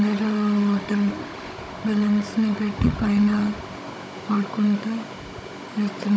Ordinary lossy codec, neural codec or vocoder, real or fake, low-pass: none; codec, 16 kHz, 16 kbps, FunCodec, trained on Chinese and English, 50 frames a second; fake; none